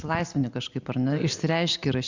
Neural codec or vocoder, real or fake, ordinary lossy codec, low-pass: none; real; Opus, 64 kbps; 7.2 kHz